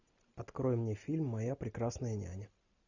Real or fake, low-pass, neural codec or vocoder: real; 7.2 kHz; none